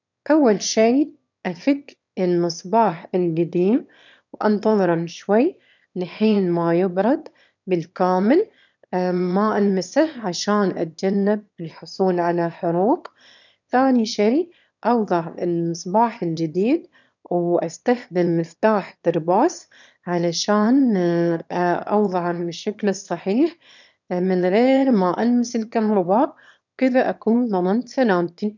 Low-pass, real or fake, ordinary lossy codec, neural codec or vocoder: 7.2 kHz; fake; none; autoencoder, 22.05 kHz, a latent of 192 numbers a frame, VITS, trained on one speaker